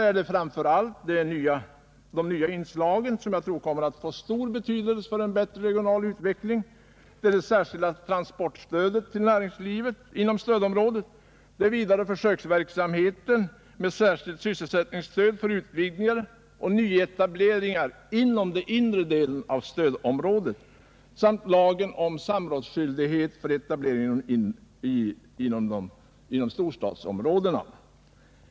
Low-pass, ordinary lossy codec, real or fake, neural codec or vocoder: none; none; real; none